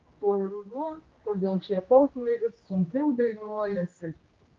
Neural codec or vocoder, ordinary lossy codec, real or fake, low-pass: codec, 16 kHz, 1 kbps, X-Codec, HuBERT features, trained on general audio; Opus, 32 kbps; fake; 7.2 kHz